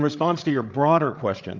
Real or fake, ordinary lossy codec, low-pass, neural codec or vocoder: fake; Opus, 24 kbps; 7.2 kHz; codec, 16 kHz, 16 kbps, FreqCodec, larger model